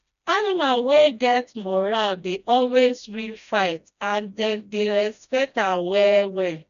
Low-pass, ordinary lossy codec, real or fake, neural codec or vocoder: 7.2 kHz; none; fake; codec, 16 kHz, 1 kbps, FreqCodec, smaller model